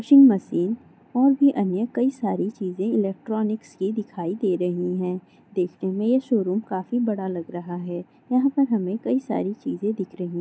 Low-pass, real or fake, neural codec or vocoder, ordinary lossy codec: none; real; none; none